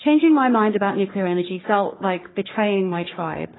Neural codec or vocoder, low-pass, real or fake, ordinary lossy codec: codec, 44.1 kHz, 3.4 kbps, Pupu-Codec; 7.2 kHz; fake; AAC, 16 kbps